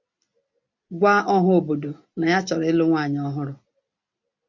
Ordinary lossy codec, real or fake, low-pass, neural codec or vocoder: MP3, 64 kbps; real; 7.2 kHz; none